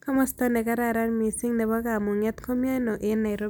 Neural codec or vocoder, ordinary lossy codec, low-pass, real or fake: none; none; none; real